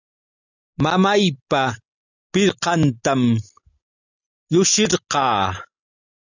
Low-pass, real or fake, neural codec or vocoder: 7.2 kHz; real; none